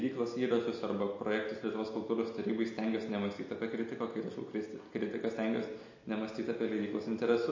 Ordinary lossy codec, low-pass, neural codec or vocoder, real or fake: MP3, 32 kbps; 7.2 kHz; none; real